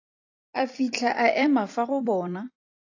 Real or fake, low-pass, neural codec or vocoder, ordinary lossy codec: fake; 7.2 kHz; vocoder, 44.1 kHz, 128 mel bands every 256 samples, BigVGAN v2; AAC, 48 kbps